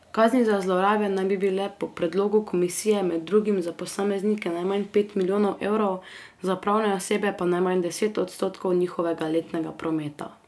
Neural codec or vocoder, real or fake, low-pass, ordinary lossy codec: none; real; none; none